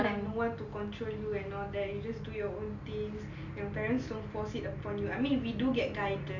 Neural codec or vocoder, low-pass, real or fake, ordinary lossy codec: none; 7.2 kHz; real; none